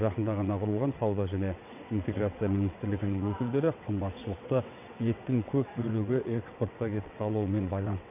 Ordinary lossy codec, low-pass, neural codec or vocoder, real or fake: none; 3.6 kHz; vocoder, 22.05 kHz, 80 mel bands, WaveNeXt; fake